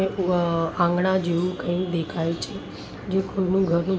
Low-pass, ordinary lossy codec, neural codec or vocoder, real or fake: none; none; none; real